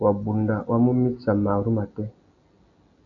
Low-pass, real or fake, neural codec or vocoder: 7.2 kHz; real; none